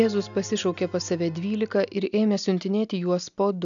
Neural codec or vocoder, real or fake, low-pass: none; real; 7.2 kHz